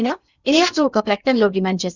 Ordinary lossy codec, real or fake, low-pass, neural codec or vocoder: none; fake; 7.2 kHz; codec, 16 kHz in and 24 kHz out, 0.6 kbps, FocalCodec, streaming, 2048 codes